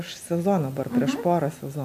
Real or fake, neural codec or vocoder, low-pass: real; none; 14.4 kHz